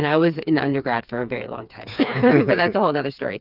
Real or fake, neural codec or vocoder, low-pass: fake; codec, 16 kHz, 4 kbps, FreqCodec, smaller model; 5.4 kHz